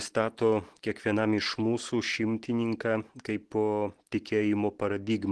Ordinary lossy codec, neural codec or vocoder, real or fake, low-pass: Opus, 16 kbps; none; real; 10.8 kHz